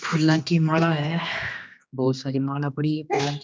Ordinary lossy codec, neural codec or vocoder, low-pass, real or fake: none; codec, 16 kHz, 2 kbps, X-Codec, HuBERT features, trained on general audio; none; fake